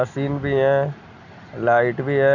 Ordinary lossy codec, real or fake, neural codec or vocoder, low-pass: none; real; none; 7.2 kHz